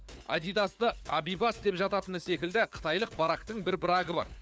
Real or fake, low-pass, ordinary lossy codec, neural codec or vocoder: fake; none; none; codec, 16 kHz, 4 kbps, FunCodec, trained on LibriTTS, 50 frames a second